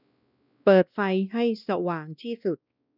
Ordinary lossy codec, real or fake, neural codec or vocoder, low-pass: none; fake; codec, 16 kHz, 1 kbps, X-Codec, WavLM features, trained on Multilingual LibriSpeech; 5.4 kHz